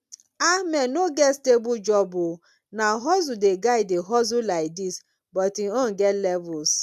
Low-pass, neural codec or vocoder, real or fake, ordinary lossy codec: 14.4 kHz; none; real; none